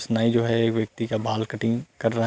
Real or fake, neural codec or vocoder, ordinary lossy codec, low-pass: real; none; none; none